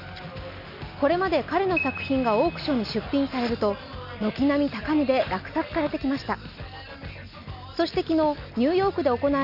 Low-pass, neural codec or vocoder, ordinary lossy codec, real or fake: 5.4 kHz; none; none; real